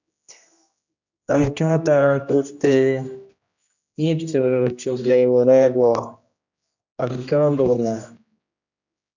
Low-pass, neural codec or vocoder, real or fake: 7.2 kHz; codec, 16 kHz, 1 kbps, X-Codec, HuBERT features, trained on general audio; fake